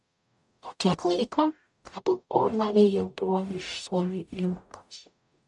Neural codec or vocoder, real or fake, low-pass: codec, 44.1 kHz, 0.9 kbps, DAC; fake; 10.8 kHz